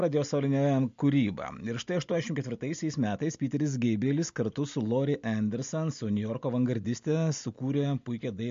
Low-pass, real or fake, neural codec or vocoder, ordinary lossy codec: 7.2 kHz; real; none; MP3, 48 kbps